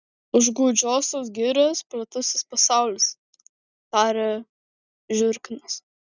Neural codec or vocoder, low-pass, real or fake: none; 7.2 kHz; real